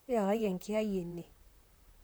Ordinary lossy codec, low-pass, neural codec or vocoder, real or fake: none; none; vocoder, 44.1 kHz, 128 mel bands, Pupu-Vocoder; fake